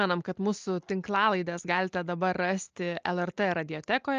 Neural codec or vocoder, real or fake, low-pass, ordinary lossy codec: none; real; 7.2 kHz; Opus, 24 kbps